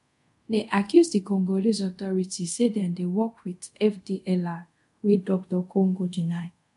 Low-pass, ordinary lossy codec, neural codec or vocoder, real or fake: 10.8 kHz; MP3, 64 kbps; codec, 24 kHz, 0.5 kbps, DualCodec; fake